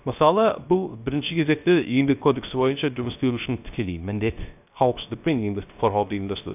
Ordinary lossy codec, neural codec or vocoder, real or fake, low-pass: none; codec, 16 kHz, 0.3 kbps, FocalCodec; fake; 3.6 kHz